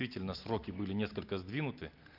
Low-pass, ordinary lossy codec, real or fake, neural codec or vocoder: 5.4 kHz; Opus, 32 kbps; real; none